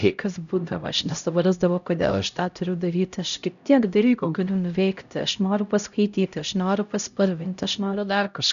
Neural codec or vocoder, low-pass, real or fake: codec, 16 kHz, 0.5 kbps, X-Codec, HuBERT features, trained on LibriSpeech; 7.2 kHz; fake